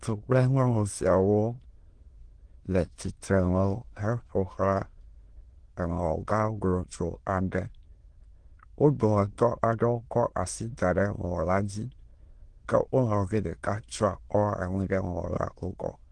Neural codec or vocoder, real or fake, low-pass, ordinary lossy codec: autoencoder, 22.05 kHz, a latent of 192 numbers a frame, VITS, trained on many speakers; fake; 9.9 kHz; Opus, 16 kbps